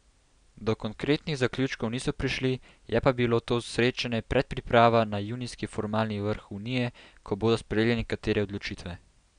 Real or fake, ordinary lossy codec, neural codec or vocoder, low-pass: real; none; none; 9.9 kHz